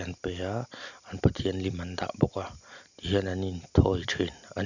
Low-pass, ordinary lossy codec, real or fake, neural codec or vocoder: 7.2 kHz; none; real; none